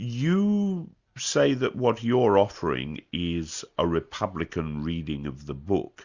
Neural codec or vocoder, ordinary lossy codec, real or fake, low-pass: none; Opus, 64 kbps; real; 7.2 kHz